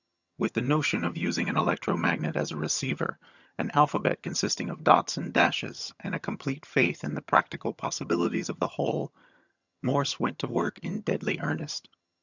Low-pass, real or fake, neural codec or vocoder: 7.2 kHz; fake; vocoder, 22.05 kHz, 80 mel bands, HiFi-GAN